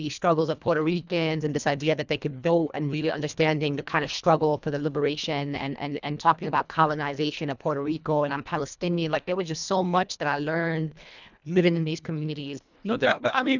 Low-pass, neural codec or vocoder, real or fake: 7.2 kHz; codec, 24 kHz, 1.5 kbps, HILCodec; fake